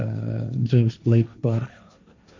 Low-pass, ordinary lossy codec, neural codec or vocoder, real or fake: 7.2 kHz; MP3, 64 kbps; codec, 16 kHz, 1.1 kbps, Voila-Tokenizer; fake